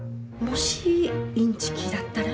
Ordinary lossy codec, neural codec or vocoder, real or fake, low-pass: none; none; real; none